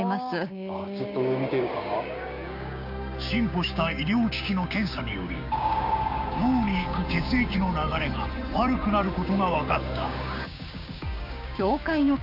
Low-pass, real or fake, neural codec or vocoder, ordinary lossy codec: 5.4 kHz; fake; codec, 16 kHz, 6 kbps, DAC; none